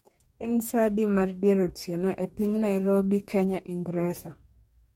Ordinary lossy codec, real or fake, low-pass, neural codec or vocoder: MP3, 64 kbps; fake; 19.8 kHz; codec, 44.1 kHz, 2.6 kbps, DAC